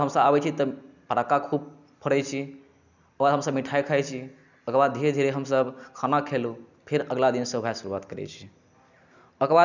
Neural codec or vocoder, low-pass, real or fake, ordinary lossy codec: none; 7.2 kHz; real; none